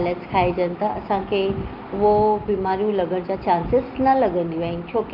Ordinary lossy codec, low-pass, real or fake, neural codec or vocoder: Opus, 32 kbps; 5.4 kHz; real; none